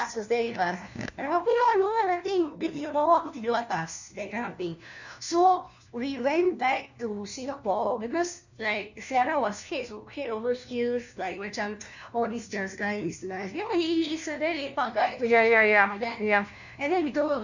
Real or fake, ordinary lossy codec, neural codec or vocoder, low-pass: fake; none; codec, 16 kHz, 1 kbps, FunCodec, trained on LibriTTS, 50 frames a second; 7.2 kHz